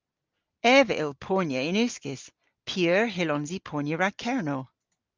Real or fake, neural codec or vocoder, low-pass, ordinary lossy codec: real; none; 7.2 kHz; Opus, 24 kbps